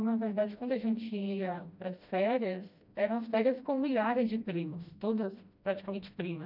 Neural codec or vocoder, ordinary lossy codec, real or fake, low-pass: codec, 16 kHz, 1 kbps, FreqCodec, smaller model; none; fake; 5.4 kHz